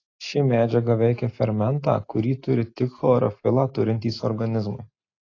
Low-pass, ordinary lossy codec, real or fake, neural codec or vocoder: 7.2 kHz; AAC, 32 kbps; real; none